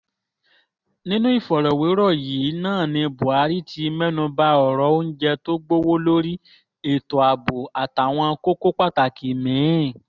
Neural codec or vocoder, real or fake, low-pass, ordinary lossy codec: none; real; 7.2 kHz; none